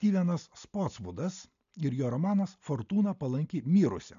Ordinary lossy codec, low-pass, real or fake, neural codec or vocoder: MP3, 64 kbps; 7.2 kHz; real; none